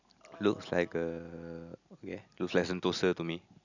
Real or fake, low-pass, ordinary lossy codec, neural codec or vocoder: real; 7.2 kHz; none; none